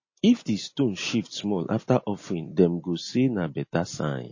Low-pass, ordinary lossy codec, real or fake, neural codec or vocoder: 7.2 kHz; MP3, 32 kbps; real; none